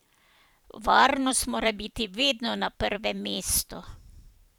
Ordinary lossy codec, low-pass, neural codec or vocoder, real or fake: none; none; vocoder, 44.1 kHz, 128 mel bands every 512 samples, BigVGAN v2; fake